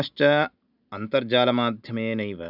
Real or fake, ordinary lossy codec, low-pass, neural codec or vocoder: real; none; 5.4 kHz; none